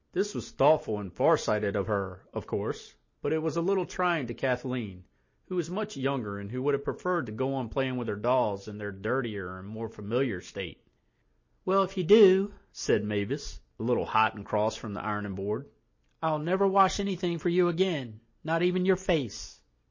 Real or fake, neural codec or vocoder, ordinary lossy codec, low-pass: real; none; MP3, 32 kbps; 7.2 kHz